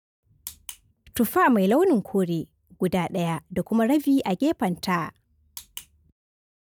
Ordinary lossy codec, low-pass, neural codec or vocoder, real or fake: none; 19.8 kHz; none; real